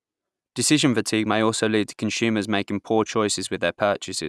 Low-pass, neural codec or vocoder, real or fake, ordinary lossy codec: none; none; real; none